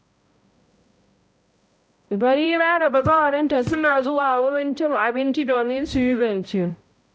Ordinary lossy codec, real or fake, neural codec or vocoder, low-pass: none; fake; codec, 16 kHz, 0.5 kbps, X-Codec, HuBERT features, trained on balanced general audio; none